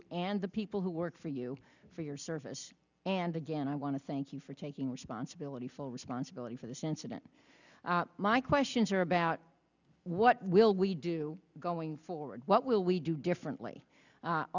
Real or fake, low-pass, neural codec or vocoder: real; 7.2 kHz; none